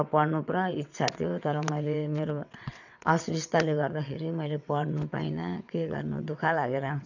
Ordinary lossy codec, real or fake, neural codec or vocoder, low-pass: none; fake; vocoder, 22.05 kHz, 80 mel bands, WaveNeXt; 7.2 kHz